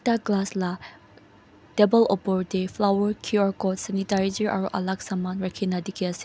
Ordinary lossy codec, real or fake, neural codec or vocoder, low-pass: none; real; none; none